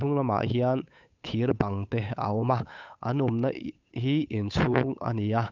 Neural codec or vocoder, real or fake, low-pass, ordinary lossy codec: codec, 16 kHz, 8 kbps, FunCodec, trained on Chinese and English, 25 frames a second; fake; 7.2 kHz; none